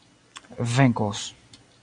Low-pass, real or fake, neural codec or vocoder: 9.9 kHz; real; none